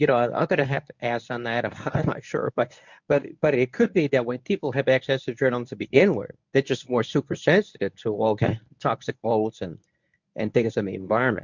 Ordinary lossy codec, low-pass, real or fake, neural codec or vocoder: MP3, 64 kbps; 7.2 kHz; fake; codec, 24 kHz, 0.9 kbps, WavTokenizer, medium speech release version 1